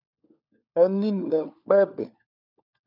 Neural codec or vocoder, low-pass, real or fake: codec, 16 kHz, 4 kbps, FunCodec, trained on LibriTTS, 50 frames a second; 5.4 kHz; fake